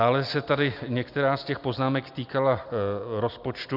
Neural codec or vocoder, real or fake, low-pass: none; real; 5.4 kHz